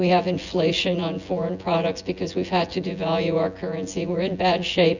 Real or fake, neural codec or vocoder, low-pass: fake; vocoder, 24 kHz, 100 mel bands, Vocos; 7.2 kHz